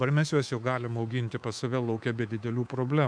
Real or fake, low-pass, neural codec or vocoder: fake; 9.9 kHz; codec, 24 kHz, 3.1 kbps, DualCodec